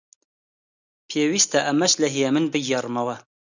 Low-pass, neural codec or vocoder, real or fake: 7.2 kHz; none; real